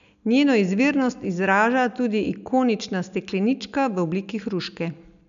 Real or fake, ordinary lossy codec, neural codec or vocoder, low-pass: real; none; none; 7.2 kHz